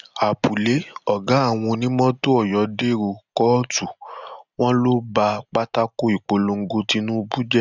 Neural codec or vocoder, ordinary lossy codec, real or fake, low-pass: none; none; real; 7.2 kHz